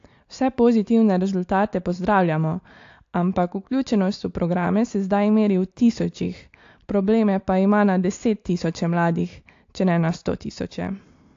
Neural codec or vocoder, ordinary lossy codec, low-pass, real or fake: none; AAC, 48 kbps; 7.2 kHz; real